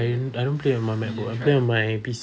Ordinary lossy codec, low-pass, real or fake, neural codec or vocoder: none; none; real; none